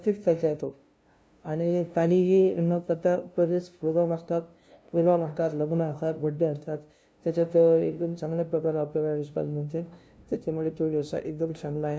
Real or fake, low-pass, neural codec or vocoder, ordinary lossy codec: fake; none; codec, 16 kHz, 0.5 kbps, FunCodec, trained on LibriTTS, 25 frames a second; none